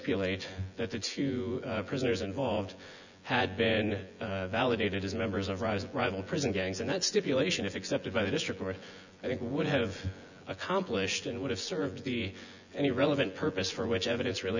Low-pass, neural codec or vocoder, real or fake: 7.2 kHz; vocoder, 24 kHz, 100 mel bands, Vocos; fake